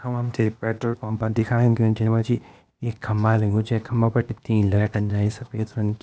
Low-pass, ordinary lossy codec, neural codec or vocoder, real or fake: none; none; codec, 16 kHz, 0.8 kbps, ZipCodec; fake